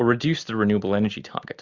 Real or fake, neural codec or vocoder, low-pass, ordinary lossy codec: real; none; 7.2 kHz; Opus, 64 kbps